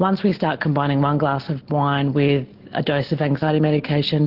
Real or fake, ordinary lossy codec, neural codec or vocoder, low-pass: real; Opus, 16 kbps; none; 5.4 kHz